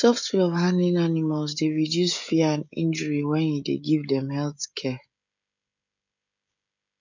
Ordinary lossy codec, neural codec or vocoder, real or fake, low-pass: none; codec, 16 kHz, 16 kbps, FreqCodec, smaller model; fake; 7.2 kHz